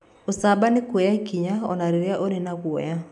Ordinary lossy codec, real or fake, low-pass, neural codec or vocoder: none; real; 10.8 kHz; none